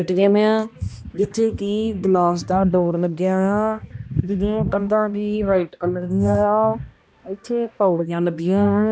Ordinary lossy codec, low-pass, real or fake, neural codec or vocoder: none; none; fake; codec, 16 kHz, 1 kbps, X-Codec, HuBERT features, trained on balanced general audio